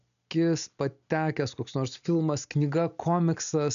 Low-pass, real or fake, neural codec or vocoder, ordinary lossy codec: 7.2 kHz; real; none; MP3, 96 kbps